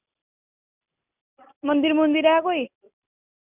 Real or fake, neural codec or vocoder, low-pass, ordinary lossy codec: real; none; 3.6 kHz; none